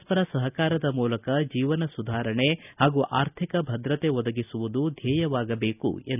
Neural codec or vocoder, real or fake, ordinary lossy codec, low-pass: none; real; none; 3.6 kHz